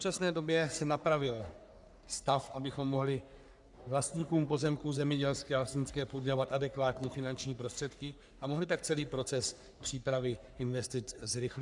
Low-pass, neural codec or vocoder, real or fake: 10.8 kHz; codec, 44.1 kHz, 3.4 kbps, Pupu-Codec; fake